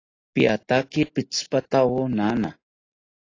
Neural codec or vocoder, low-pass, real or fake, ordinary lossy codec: none; 7.2 kHz; real; AAC, 32 kbps